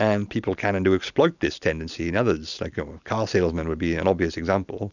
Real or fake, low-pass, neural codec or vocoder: real; 7.2 kHz; none